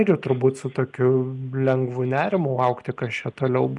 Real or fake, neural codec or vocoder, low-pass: real; none; 10.8 kHz